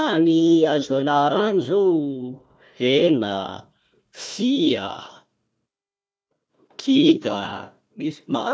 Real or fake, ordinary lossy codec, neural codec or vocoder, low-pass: fake; none; codec, 16 kHz, 1 kbps, FunCodec, trained on Chinese and English, 50 frames a second; none